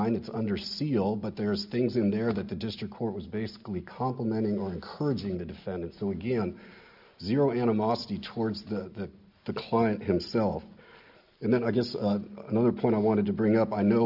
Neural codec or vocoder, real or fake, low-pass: none; real; 5.4 kHz